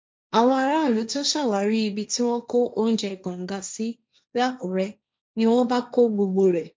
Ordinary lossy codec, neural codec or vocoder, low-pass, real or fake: none; codec, 16 kHz, 1.1 kbps, Voila-Tokenizer; none; fake